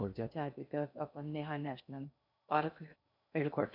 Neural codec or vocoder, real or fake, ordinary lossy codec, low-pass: codec, 16 kHz in and 24 kHz out, 0.6 kbps, FocalCodec, streaming, 2048 codes; fake; none; 5.4 kHz